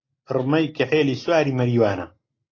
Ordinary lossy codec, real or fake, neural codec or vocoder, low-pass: AAC, 32 kbps; real; none; 7.2 kHz